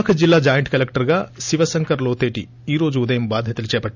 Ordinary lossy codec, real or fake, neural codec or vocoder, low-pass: none; real; none; 7.2 kHz